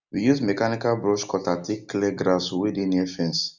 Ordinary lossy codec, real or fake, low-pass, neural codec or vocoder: none; real; 7.2 kHz; none